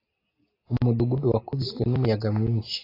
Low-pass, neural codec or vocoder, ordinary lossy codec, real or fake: 5.4 kHz; none; AAC, 24 kbps; real